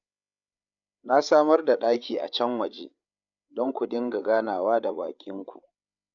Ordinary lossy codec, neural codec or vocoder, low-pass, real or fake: none; codec, 16 kHz, 8 kbps, FreqCodec, larger model; 7.2 kHz; fake